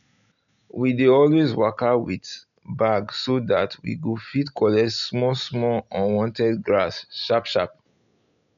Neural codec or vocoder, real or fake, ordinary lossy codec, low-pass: none; real; none; 7.2 kHz